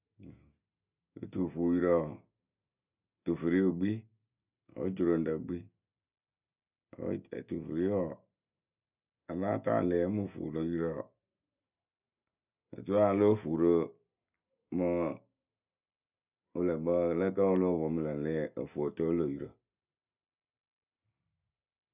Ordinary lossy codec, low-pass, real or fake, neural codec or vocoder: none; 3.6 kHz; real; none